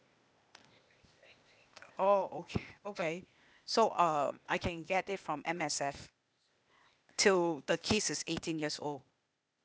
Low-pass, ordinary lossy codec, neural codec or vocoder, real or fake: none; none; codec, 16 kHz, 0.8 kbps, ZipCodec; fake